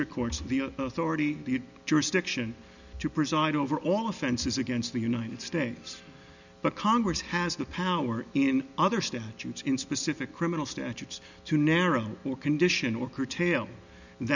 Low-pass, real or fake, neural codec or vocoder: 7.2 kHz; real; none